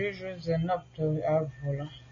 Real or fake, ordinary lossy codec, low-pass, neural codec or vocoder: real; MP3, 32 kbps; 7.2 kHz; none